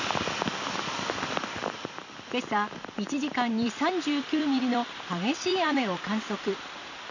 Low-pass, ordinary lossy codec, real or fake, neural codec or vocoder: 7.2 kHz; none; fake; vocoder, 44.1 kHz, 128 mel bands every 256 samples, BigVGAN v2